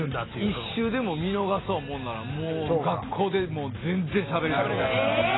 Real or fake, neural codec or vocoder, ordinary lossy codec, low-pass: real; none; AAC, 16 kbps; 7.2 kHz